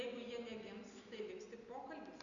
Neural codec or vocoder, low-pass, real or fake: none; 7.2 kHz; real